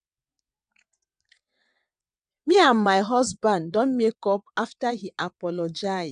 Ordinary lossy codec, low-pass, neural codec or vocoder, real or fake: none; 9.9 kHz; vocoder, 22.05 kHz, 80 mel bands, WaveNeXt; fake